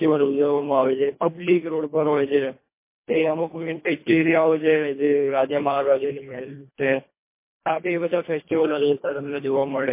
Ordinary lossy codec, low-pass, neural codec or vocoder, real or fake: MP3, 24 kbps; 3.6 kHz; codec, 24 kHz, 1.5 kbps, HILCodec; fake